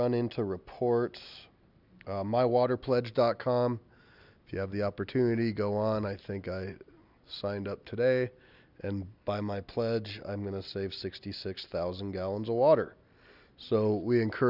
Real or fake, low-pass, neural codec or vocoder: real; 5.4 kHz; none